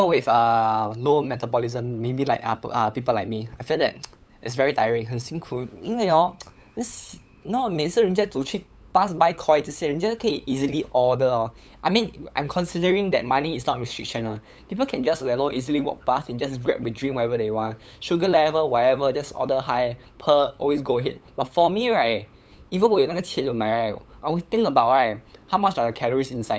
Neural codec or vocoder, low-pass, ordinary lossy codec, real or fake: codec, 16 kHz, 8 kbps, FunCodec, trained on LibriTTS, 25 frames a second; none; none; fake